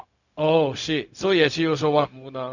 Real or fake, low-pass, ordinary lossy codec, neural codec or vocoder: fake; 7.2 kHz; none; codec, 16 kHz, 0.4 kbps, LongCat-Audio-Codec